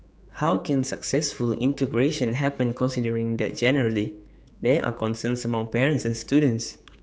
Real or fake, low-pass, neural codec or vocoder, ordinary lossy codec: fake; none; codec, 16 kHz, 4 kbps, X-Codec, HuBERT features, trained on general audio; none